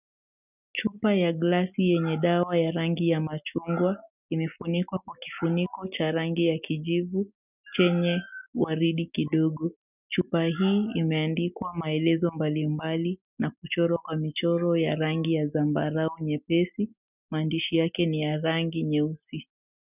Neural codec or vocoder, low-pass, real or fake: none; 3.6 kHz; real